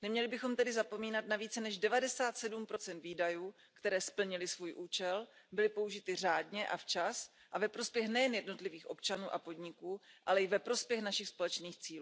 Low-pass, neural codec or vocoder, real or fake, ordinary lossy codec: none; none; real; none